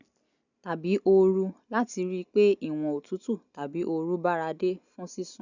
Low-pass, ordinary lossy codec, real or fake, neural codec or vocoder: 7.2 kHz; none; real; none